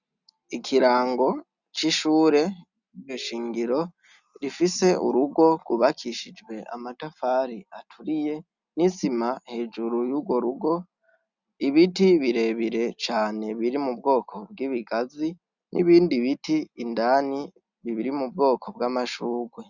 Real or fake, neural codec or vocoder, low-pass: real; none; 7.2 kHz